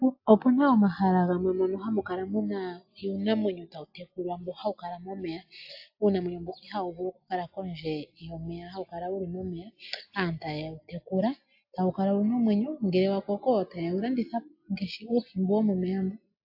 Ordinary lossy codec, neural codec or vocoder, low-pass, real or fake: AAC, 48 kbps; none; 5.4 kHz; real